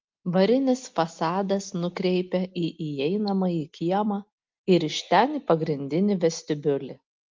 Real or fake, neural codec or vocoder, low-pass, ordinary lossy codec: real; none; 7.2 kHz; Opus, 32 kbps